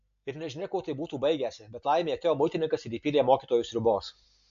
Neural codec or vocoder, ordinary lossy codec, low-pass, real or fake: none; AAC, 64 kbps; 7.2 kHz; real